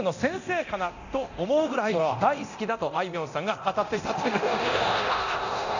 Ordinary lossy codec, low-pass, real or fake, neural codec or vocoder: none; 7.2 kHz; fake; codec, 24 kHz, 0.9 kbps, DualCodec